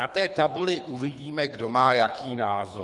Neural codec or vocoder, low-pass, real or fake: codec, 24 kHz, 3 kbps, HILCodec; 10.8 kHz; fake